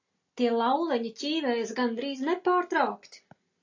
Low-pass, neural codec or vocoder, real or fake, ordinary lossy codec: 7.2 kHz; none; real; AAC, 48 kbps